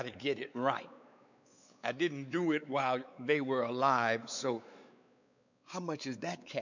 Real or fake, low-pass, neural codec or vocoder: fake; 7.2 kHz; codec, 16 kHz, 4 kbps, X-Codec, WavLM features, trained on Multilingual LibriSpeech